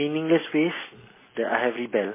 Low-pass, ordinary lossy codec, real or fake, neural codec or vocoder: 3.6 kHz; MP3, 16 kbps; real; none